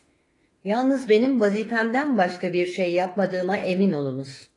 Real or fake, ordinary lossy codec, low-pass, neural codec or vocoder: fake; AAC, 32 kbps; 10.8 kHz; autoencoder, 48 kHz, 32 numbers a frame, DAC-VAE, trained on Japanese speech